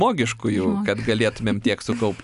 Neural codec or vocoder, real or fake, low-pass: none; real; 10.8 kHz